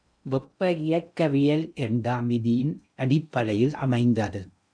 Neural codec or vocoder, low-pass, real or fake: codec, 16 kHz in and 24 kHz out, 0.6 kbps, FocalCodec, streaming, 2048 codes; 9.9 kHz; fake